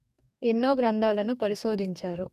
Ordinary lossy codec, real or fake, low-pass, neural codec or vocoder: none; fake; 14.4 kHz; codec, 44.1 kHz, 2.6 kbps, DAC